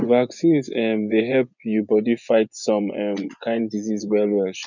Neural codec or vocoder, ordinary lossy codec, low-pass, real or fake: vocoder, 24 kHz, 100 mel bands, Vocos; none; 7.2 kHz; fake